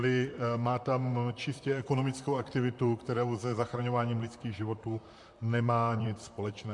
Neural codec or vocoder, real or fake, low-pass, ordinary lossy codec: vocoder, 44.1 kHz, 128 mel bands, Pupu-Vocoder; fake; 10.8 kHz; MP3, 64 kbps